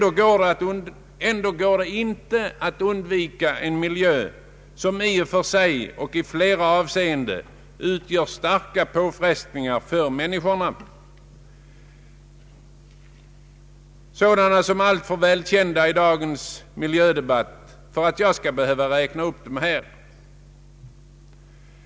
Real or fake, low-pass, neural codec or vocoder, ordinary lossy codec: real; none; none; none